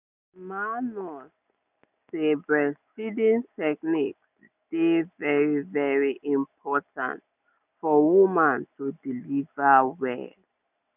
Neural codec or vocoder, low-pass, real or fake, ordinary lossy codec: none; 3.6 kHz; real; none